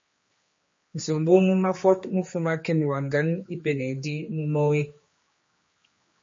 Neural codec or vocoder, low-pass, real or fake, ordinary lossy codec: codec, 16 kHz, 2 kbps, X-Codec, HuBERT features, trained on general audio; 7.2 kHz; fake; MP3, 32 kbps